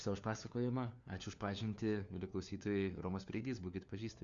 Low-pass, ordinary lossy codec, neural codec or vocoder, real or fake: 7.2 kHz; Opus, 64 kbps; codec, 16 kHz, 4 kbps, FunCodec, trained on LibriTTS, 50 frames a second; fake